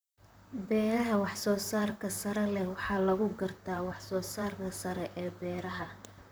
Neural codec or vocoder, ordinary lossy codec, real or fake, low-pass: vocoder, 44.1 kHz, 128 mel bands, Pupu-Vocoder; none; fake; none